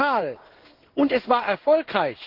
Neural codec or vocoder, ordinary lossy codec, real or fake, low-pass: codec, 16 kHz in and 24 kHz out, 1 kbps, XY-Tokenizer; Opus, 16 kbps; fake; 5.4 kHz